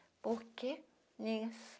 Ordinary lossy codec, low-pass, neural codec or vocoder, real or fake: none; none; none; real